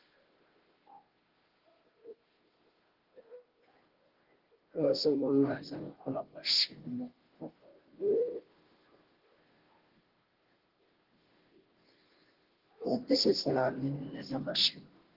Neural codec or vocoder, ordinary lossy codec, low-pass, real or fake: codec, 16 kHz, 0.5 kbps, FunCodec, trained on Chinese and English, 25 frames a second; Opus, 16 kbps; 5.4 kHz; fake